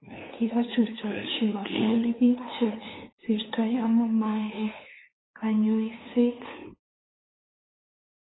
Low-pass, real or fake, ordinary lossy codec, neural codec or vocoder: 7.2 kHz; fake; AAC, 16 kbps; codec, 16 kHz, 2 kbps, FunCodec, trained on LibriTTS, 25 frames a second